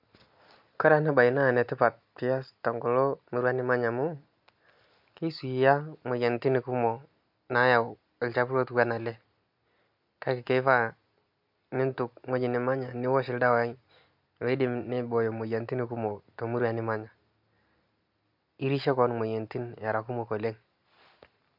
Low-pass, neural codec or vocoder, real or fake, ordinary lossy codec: 5.4 kHz; none; real; MP3, 48 kbps